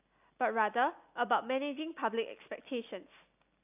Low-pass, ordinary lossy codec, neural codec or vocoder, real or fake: 3.6 kHz; AAC, 32 kbps; none; real